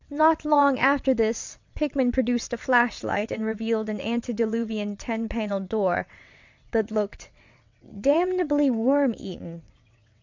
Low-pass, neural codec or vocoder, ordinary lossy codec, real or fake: 7.2 kHz; vocoder, 22.05 kHz, 80 mel bands, WaveNeXt; MP3, 64 kbps; fake